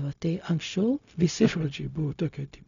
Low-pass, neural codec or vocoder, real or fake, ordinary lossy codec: 7.2 kHz; codec, 16 kHz, 0.4 kbps, LongCat-Audio-Codec; fake; MP3, 96 kbps